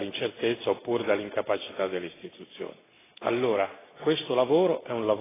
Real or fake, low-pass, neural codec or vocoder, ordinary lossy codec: real; 3.6 kHz; none; AAC, 16 kbps